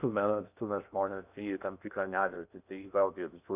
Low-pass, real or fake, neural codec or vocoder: 3.6 kHz; fake; codec, 16 kHz in and 24 kHz out, 0.6 kbps, FocalCodec, streaming, 2048 codes